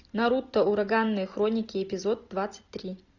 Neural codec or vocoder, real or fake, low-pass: none; real; 7.2 kHz